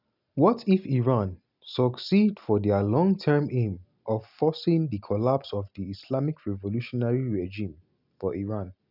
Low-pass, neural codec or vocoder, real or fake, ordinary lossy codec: 5.4 kHz; none; real; none